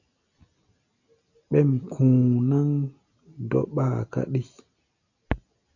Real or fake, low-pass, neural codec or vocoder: real; 7.2 kHz; none